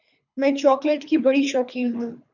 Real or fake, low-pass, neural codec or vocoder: fake; 7.2 kHz; codec, 24 kHz, 3 kbps, HILCodec